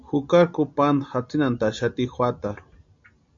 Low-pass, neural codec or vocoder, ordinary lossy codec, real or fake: 7.2 kHz; none; MP3, 48 kbps; real